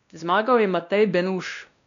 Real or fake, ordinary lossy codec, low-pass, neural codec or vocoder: fake; none; 7.2 kHz; codec, 16 kHz, 1 kbps, X-Codec, WavLM features, trained on Multilingual LibriSpeech